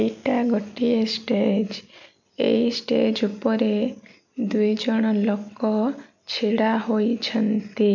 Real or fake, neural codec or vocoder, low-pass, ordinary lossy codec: real; none; 7.2 kHz; none